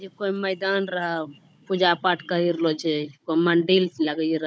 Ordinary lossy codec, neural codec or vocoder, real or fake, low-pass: none; codec, 16 kHz, 16 kbps, FunCodec, trained on Chinese and English, 50 frames a second; fake; none